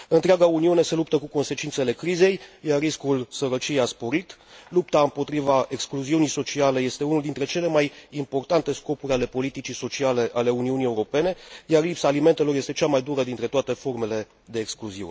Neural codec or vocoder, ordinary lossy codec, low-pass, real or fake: none; none; none; real